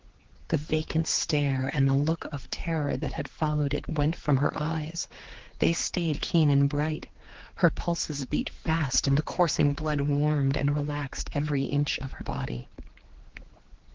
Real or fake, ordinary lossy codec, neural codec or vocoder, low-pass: fake; Opus, 16 kbps; codec, 16 kHz, 4 kbps, X-Codec, HuBERT features, trained on general audio; 7.2 kHz